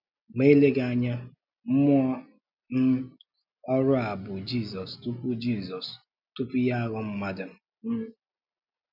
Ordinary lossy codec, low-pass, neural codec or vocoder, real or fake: none; 5.4 kHz; none; real